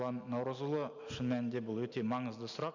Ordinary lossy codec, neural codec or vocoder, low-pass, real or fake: none; none; 7.2 kHz; real